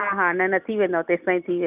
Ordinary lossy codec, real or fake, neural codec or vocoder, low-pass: none; real; none; 3.6 kHz